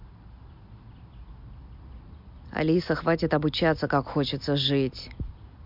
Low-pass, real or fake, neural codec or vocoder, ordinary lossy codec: 5.4 kHz; real; none; MP3, 48 kbps